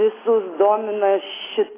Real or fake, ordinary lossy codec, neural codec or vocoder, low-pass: real; AAC, 16 kbps; none; 3.6 kHz